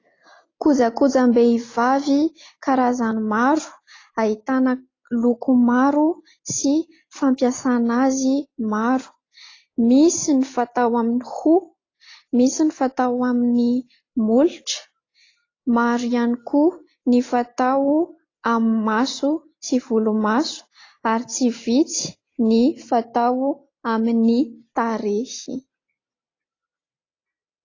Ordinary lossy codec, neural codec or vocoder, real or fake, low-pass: AAC, 32 kbps; none; real; 7.2 kHz